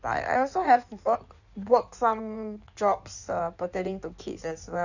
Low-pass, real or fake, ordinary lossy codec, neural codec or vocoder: 7.2 kHz; fake; none; codec, 16 kHz in and 24 kHz out, 1.1 kbps, FireRedTTS-2 codec